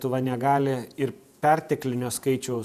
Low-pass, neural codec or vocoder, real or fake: 14.4 kHz; vocoder, 48 kHz, 128 mel bands, Vocos; fake